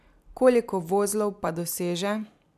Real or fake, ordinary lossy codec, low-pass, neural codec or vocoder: real; none; 14.4 kHz; none